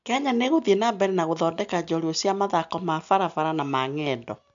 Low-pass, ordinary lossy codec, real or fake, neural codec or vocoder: 7.2 kHz; MP3, 64 kbps; real; none